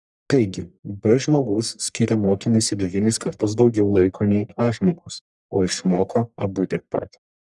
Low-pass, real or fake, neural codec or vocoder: 10.8 kHz; fake; codec, 44.1 kHz, 1.7 kbps, Pupu-Codec